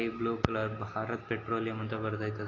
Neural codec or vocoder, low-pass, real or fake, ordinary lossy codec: none; 7.2 kHz; real; AAC, 32 kbps